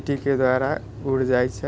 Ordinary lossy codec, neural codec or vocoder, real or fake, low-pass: none; none; real; none